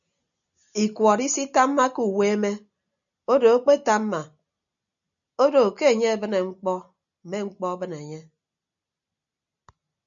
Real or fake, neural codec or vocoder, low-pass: real; none; 7.2 kHz